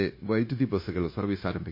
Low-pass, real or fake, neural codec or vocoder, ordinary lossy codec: 5.4 kHz; fake; codec, 16 kHz, 0.9 kbps, LongCat-Audio-Codec; MP3, 24 kbps